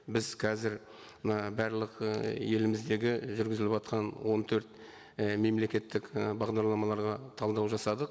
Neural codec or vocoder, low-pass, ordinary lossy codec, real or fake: none; none; none; real